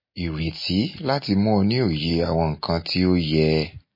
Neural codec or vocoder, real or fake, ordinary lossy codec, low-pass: none; real; MP3, 24 kbps; 5.4 kHz